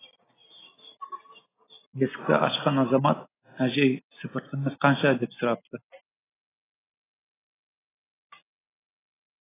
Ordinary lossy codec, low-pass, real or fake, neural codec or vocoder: AAC, 16 kbps; 3.6 kHz; real; none